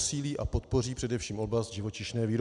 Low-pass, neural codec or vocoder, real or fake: 10.8 kHz; none; real